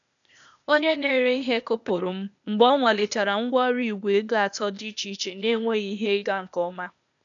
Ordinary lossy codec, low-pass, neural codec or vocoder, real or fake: none; 7.2 kHz; codec, 16 kHz, 0.8 kbps, ZipCodec; fake